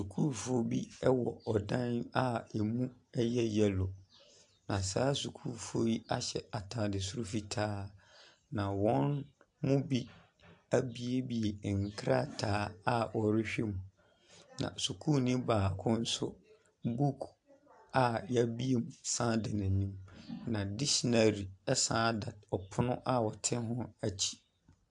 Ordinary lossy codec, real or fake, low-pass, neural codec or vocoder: AAC, 64 kbps; real; 10.8 kHz; none